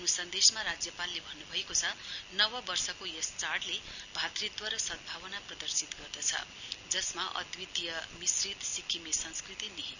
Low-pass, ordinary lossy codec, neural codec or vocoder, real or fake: 7.2 kHz; none; none; real